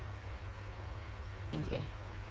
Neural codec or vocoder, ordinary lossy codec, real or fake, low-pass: codec, 16 kHz, 4 kbps, FreqCodec, smaller model; none; fake; none